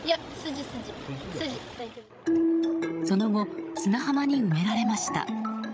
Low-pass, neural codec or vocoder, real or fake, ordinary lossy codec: none; codec, 16 kHz, 16 kbps, FreqCodec, larger model; fake; none